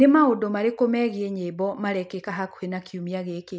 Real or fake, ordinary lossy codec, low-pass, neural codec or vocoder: real; none; none; none